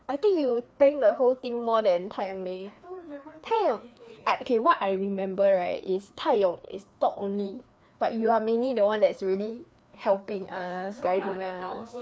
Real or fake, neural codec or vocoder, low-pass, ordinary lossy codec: fake; codec, 16 kHz, 2 kbps, FreqCodec, larger model; none; none